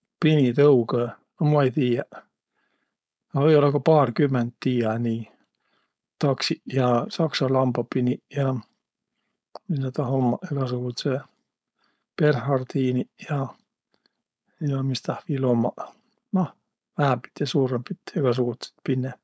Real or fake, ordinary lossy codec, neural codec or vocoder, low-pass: fake; none; codec, 16 kHz, 4.8 kbps, FACodec; none